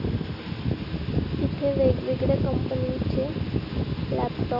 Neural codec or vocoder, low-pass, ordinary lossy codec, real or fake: none; 5.4 kHz; none; real